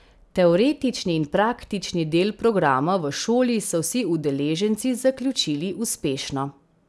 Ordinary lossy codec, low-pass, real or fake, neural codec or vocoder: none; none; real; none